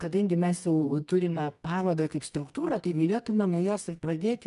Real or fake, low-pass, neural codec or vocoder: fake; 10.8 kHz; codec, 24 kHz, 0.9 kbps, WavTokenizer, medium music audio release